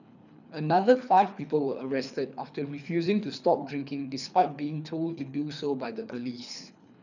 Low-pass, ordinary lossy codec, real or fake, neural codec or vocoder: 7.2 kHz; none; fake; codec, 24 kHz, 3 kbps, HILCodec